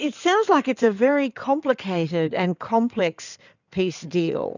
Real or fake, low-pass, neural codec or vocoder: fake; 7.2 kHz; codec, 16 kHz in and 24 kHz out, 2.2 kbps, FireRedTTS-2 codec